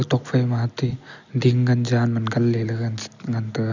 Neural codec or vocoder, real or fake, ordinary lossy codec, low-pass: none; real; none; 7.2 kHz